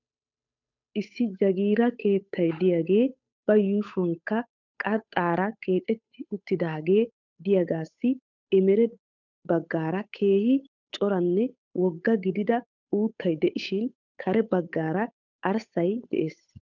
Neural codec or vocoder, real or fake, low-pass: codec, 16 kHz, 8 kbps, FunCodec, trained on Chinese and English, 25 frames a second; fake; 7.2 kHz